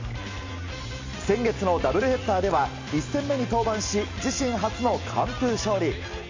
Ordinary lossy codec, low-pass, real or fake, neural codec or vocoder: MP3, 64 kbps; 7.2 kHz; real; none